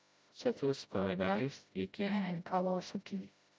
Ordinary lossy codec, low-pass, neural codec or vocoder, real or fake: none; none; codec, 16 kHz, 0.5 kbps, FreqCodec, smaller model; fake